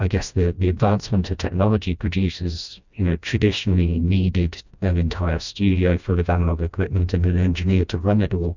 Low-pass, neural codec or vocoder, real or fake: 7.2 kHz; codec, 16 kHz, 1 kbps, FreqCodec, smaller model; fake